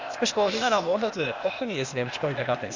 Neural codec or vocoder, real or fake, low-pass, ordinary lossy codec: codec, 16 kHz, 0.8 kbps, ZipCodec; fake; 7.2 kHz; Opus, 64 kbps